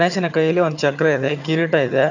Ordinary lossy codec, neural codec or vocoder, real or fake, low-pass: none; vocoder, 22.05 kHz, 80 mel bands, HiFi-GAN; fake; 7.2 kHz